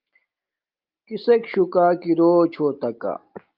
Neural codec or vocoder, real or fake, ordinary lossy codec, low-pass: none; real; Opus, 24 kbps; 5.4 kHz